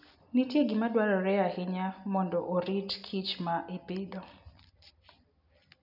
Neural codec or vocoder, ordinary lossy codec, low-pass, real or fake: none; none; 5.4 kHz; real